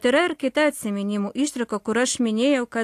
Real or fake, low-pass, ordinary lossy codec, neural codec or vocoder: real; 14.4 kHz; AAC, 64 kbps; none